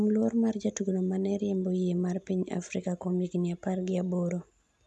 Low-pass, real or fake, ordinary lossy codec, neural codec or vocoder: none; real; none; none